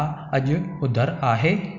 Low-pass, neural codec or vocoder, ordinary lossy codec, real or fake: 7.2 kHz; none; none; real